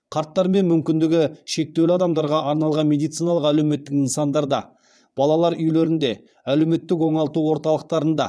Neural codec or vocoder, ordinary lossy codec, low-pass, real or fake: vocoder, 22.05 kHz, 80 mel bands, Vocos; none; none; fake